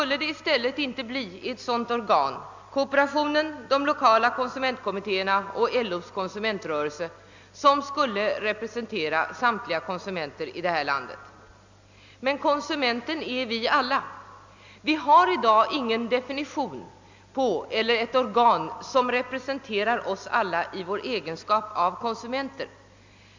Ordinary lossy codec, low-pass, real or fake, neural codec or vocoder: MP3, 64 kbps; 7.2 kHz; real; none